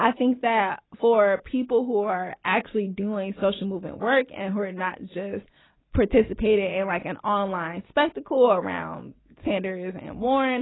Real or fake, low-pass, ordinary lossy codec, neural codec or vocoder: real; 7.2 kHz; AAC, 16 kbps; none